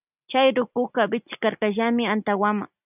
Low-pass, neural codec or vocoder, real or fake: 3.6 kHz; none; real